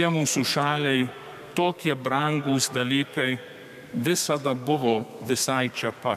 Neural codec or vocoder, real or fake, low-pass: codec, 32 kHz, 1.9 kbps, SNAC; fake; 14.4 kHz